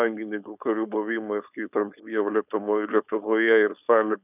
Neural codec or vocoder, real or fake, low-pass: codec, 16 kHz, 4.8 kbps, FACodec; fake; 3.6 kHz